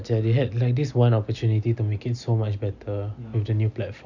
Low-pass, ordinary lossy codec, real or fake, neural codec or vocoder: 7.2 kHz; none; real; none